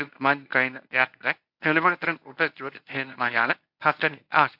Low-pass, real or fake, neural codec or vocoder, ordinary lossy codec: 5.4 kHz; fake; codec, 24 kHz, 0.9 kbps, WavTokenizer, medium speech release version 1; AAC, 48 kbps